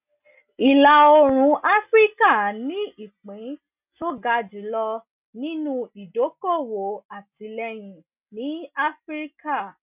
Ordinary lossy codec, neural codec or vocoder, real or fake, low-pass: none; none; real; 3.6 kHz